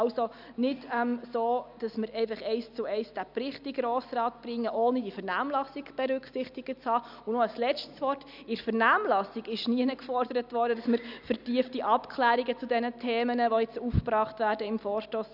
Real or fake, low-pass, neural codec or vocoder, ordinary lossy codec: real; 5.4 kHz; none; none